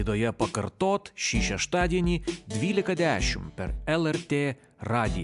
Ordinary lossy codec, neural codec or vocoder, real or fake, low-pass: AAC, 96 kbps; none; real; 10.8 kHz